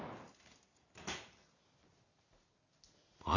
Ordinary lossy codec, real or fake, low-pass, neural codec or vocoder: none; real; 7.2 kHz; none